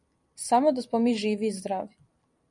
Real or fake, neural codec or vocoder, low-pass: fake; vocoder, 44.1 kHz, 128 mel bands every 256 samples, BigVGAN v2; 10.8 kHz